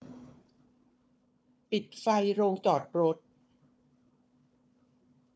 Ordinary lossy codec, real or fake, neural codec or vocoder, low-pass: none; fake; codec, 16 kHz, 4 kbps, FunCodec, trained on Chinese and English, 50 frames a second; none